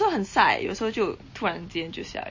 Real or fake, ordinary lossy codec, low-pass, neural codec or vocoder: real; MP3, 32 kbps; 7.2 kHz; none